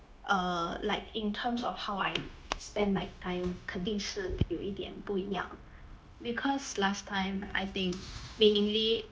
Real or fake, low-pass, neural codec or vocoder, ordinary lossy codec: fake; none; codec, 16 kHz, 0.9 kbps, LongCat-Audio-Codec; none